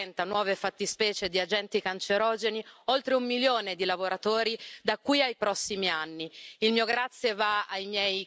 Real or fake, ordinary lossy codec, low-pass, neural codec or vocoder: real; none; none; none